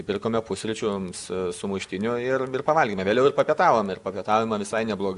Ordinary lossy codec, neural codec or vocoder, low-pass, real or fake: Opus, 64 kbps; none; 10.8 kHz; real